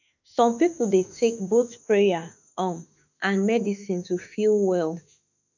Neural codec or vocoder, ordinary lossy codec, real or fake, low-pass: autoencoder, 48 kHz, 32 numbers a frame, DAC-VAE, trained on Japanese speech; none; fake; 7.2 kHz